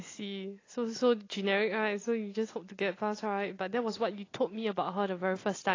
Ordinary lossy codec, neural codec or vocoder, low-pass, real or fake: AAC, 32 kbps; none; 7.2 kHz; real